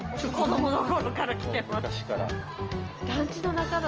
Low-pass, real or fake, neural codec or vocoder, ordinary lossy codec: 7.2 kHz; real; none; Opus, 24 kbps